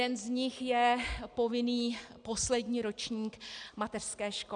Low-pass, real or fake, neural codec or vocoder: 9.9 kHz; real; none